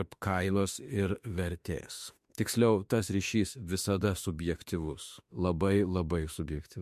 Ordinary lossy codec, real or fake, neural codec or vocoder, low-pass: MP3, 64 kbps; fake; autoencoder, 48 kHz, 32 numbers a frame, DAC-VAE, trained on Japanese speech; 14.4 kHz